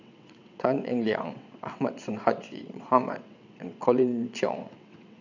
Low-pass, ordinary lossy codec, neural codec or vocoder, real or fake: 7.2 kHz; none; vocoder, 22.05 kHz, 80 mel bands, WaveNeXt; fake